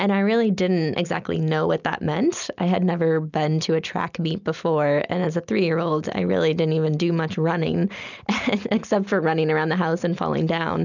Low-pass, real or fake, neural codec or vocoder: 7.2 kHz; real; none